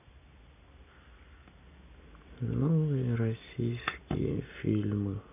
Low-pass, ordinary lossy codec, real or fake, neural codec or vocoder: 3.6 kHz; none; real; none